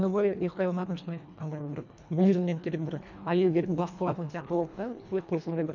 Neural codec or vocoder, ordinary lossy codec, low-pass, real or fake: codec, 24 kHz, 1.5 kbps, HILCodec; none; 7.2 kHz; fake